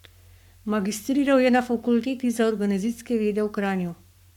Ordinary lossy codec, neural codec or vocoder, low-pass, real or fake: none; codec, 44.1 kHz, 7.8 kbps, Pupu-Codec; 19.8 kHz; fake